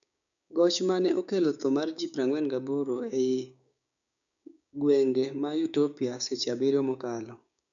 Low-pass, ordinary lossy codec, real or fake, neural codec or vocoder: 7.2 kHz; none; fake; codec, 16 kHz, 6 kbps, DAC